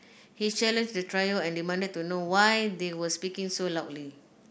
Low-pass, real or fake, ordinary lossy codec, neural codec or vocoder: none; real; none; none